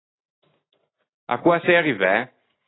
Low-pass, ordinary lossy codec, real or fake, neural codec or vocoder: 7.2 kHz; AAC, 16 kbps; real; none